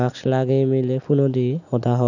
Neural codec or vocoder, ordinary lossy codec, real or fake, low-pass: none; none; real; 7.2 kHz